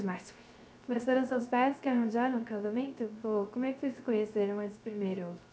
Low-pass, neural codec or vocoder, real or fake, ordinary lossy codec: none; codec, 16 kHz, 0.3 kbps, FocalCodec; fake; none